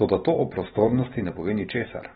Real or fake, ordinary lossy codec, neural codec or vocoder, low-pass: real; AAC, 16 kbps; none; 19.8 kHz